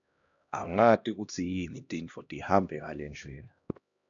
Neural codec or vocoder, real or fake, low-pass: codec, 16 kHz, 1 kbps, X-Codec, HuBERT features, trained on LibriSpeech; fake; 7.2 kHz